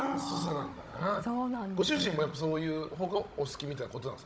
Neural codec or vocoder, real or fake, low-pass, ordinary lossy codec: codec, 16 kHz, 16 kbps, FunCodec, trained on Chinese and English, 50 frames a second; fake; none; none